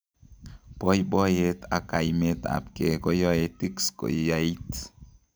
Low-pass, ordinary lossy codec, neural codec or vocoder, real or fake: none; none; none; real